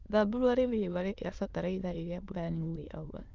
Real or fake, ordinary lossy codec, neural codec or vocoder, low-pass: fake; Opus, 24 kbps; autoencoder, 22.05 kHz, a latent of 192 numbers a frame, VITS, trained on many speakers; 7.2 kHz